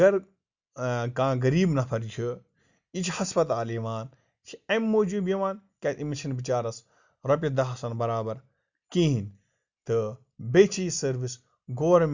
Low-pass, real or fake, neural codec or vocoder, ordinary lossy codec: 7.2 kHz; real; none; Opus, 64 kbps